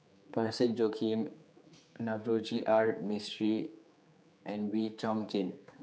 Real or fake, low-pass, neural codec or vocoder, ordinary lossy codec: fake; none; codec, 16 kHz, 4 kbps, X-Codec, HuBERT features, trained on general audio; none